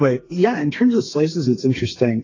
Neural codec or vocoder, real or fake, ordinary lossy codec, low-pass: codec, 32 kHz, 1.9 kbps, SNAC; fake; AAC, 32 kbps; 7.2 kHz